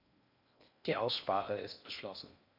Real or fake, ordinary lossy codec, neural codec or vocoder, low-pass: fake; none; codec, 16 kHz in and 24 kHz out, 0.6 kbps, FocalCodec, streaming, 4096 codes; 5.4 kHz